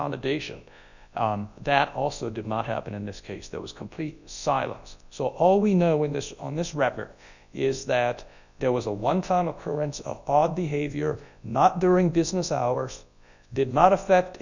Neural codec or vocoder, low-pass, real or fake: codec, 24 kHz, 0.9 kbps, WavTokenizer, large speech release; 7.2 kHz; fake